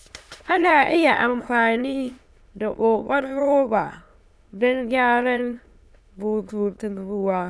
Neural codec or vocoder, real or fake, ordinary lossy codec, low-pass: autoencoder, 22.05 kHz, a latent of 192 numbers a frame, VITS, trained on many speakers; fake; none; none